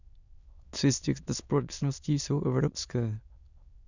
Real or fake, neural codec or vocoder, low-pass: fake; autoencoder, 22.05 kHz, a latent of 192 numbers a frame, VITS, trained on many speakers; 7.2 kHz